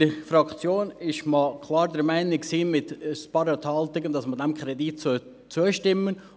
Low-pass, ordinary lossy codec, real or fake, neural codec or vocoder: none; none; real; none